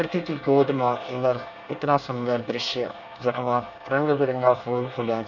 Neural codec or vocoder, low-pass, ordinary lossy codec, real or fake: codec, 24 kHz, 1 kbps, SNAC; 7.2 kHz; none; fake